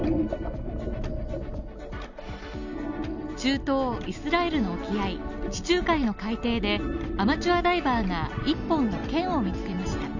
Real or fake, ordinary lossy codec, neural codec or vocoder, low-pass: real; none; none; 7.2 kHz